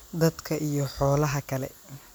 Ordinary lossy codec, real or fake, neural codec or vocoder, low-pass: none; real; none; none